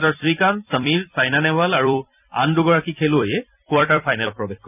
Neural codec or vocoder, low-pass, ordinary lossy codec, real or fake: vocoder, 44.1 kHz, 128 mel bands every 256 samples, BigVGAN v2; 3.6 kHz; none; fake